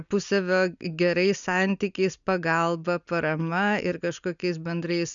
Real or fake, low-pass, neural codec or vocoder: real; 7.2 kHz; none